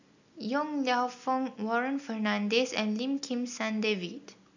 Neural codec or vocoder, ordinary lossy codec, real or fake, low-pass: none; none; real; 7.2 kHz